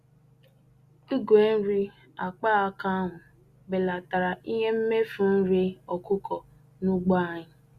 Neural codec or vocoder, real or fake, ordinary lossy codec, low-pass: none; real; Opus, 64 kbps; 14.4 kHz